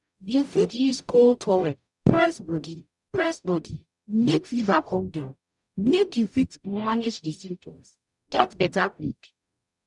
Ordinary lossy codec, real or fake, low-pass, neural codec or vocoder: none; fake; 10.8 kHz; codec, 44.1 kHz, 0.9 kbps, DAC